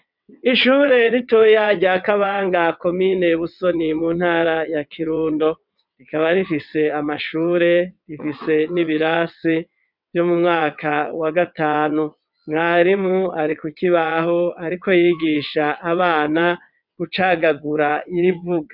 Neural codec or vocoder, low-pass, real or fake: vocoder, 22.05 kHz, 80 mel bands, WaveNeXt; 5.4 kHz; fake